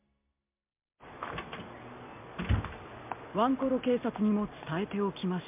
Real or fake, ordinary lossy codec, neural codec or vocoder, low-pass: real; MP3, 32 kbps; none; 3.6 kHz